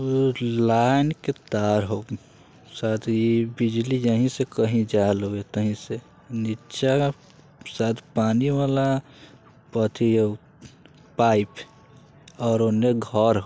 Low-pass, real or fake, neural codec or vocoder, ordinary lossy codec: none; real; none; none